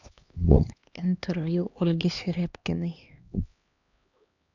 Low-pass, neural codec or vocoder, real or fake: 7.2 kHz; codec, 16 kHz, 2 kbps, X-Codec, HuBERT features, trained on LibriSpeech; fake